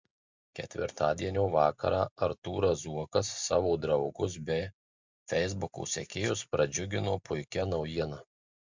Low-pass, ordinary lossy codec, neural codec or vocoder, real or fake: 7.2 kHz; MP3, 64 kbps; none; real